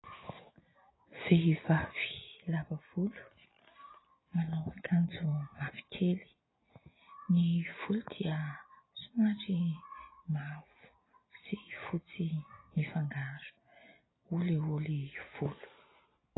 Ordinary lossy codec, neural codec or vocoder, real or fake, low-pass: AAC, 16 kbps; none; real; 7.2 kHz